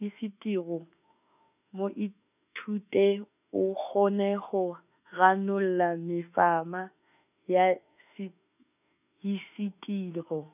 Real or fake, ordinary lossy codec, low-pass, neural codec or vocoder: fake; none; 3.6 kHz; autoencoder, 48 kHz, 32 numbers a frame, DAC-VAE, trained on Japanese speech